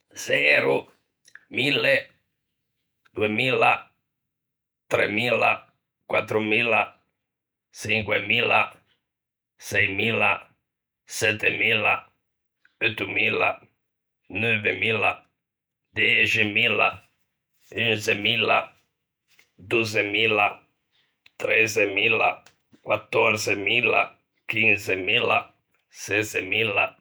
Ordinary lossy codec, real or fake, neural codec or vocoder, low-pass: none; fake; vocoder, 48 kHz, 128 mel bands, Vocos; none